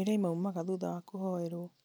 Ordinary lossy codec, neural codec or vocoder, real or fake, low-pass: none; none; real; none